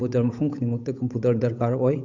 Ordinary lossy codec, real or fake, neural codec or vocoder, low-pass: none; fake; codec, 16 kHz, 8 kbps, FunCodec, trained on Chinese and English, 25 frames a second; 7.2 kHz